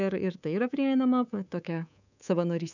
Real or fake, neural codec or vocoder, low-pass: fake; codec, 24 kHz, 3.1 kbps, DualCodec; 7.2 kHz